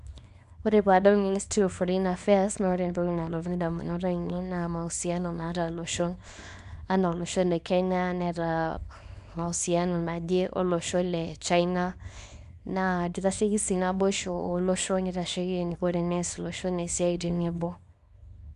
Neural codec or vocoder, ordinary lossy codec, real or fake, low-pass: codec, 24 kHz, 0.9 kbps, WavTokenizer, small release; none; fake; 10.8 kHz